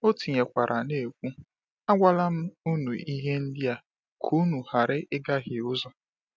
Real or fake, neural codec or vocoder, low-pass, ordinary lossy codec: real; none; none; none